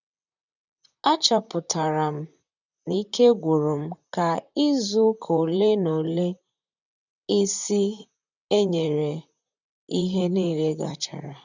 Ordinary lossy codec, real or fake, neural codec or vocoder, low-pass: none; fake; vocoder, 44.1 kHz, 128 mel bands, Pupu-Vocoder; 7.2 kHz